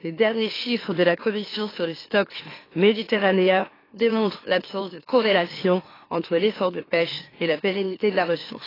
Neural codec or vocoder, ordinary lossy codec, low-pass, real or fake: autoencoder, 44.1 kHz, a latent of 192 numbers a frame, MeloTTS; AAC, 24 kbps; 5.4 kHz; fake